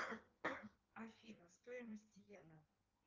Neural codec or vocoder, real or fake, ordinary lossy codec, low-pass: codec, 16 kHz in and 24 kHz out, 1.1 kbps, FireRedTTS-2 codec; fake; Opus, 24 kbps; 7.2 kHz